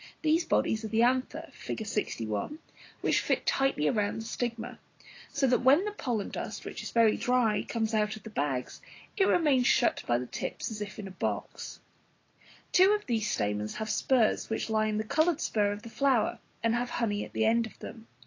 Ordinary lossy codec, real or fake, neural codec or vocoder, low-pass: AAC, 32 kbps; real; none; 7.2 kHz